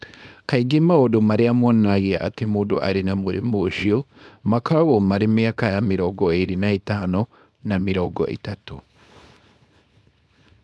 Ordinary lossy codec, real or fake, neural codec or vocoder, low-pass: none; fake; codec, 24 kHz, 0.9 kbps, WavTokenizer, small release; none